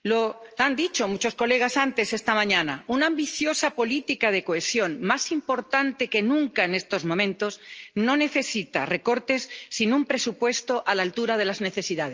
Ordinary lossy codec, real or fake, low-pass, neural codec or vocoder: Opus, 24 kbps; real; 7.2 kHz; none